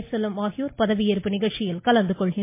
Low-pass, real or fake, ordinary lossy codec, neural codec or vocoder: 3.6 kHz; real; MP3, 24 kbps; none